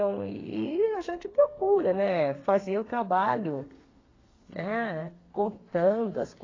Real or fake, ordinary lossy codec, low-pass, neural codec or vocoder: fake; AAC, 32 kbps; 7.2 kHz; codec, 32 kHz, 1.9 kbps, SNAC